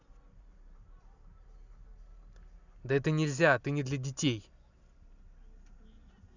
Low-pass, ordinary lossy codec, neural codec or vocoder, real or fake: 7.2 kHz; none; none; real